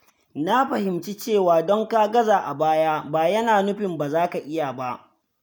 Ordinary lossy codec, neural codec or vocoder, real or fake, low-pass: none; none; real; none